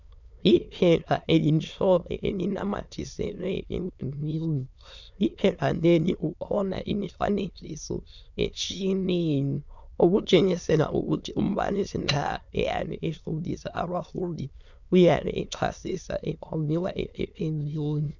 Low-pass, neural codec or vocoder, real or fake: 7.2 kHz; autoencoder, 22.05 kHz, a latent of 192 numbers a frame, VITS, trained on many speakers; fake